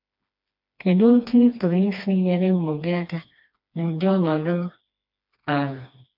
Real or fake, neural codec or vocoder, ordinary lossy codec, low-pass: fake; codec, 16 kHz, 2 kbps, FreqCodec, smaller model; MP3, 48 kbps; 5.4 kHz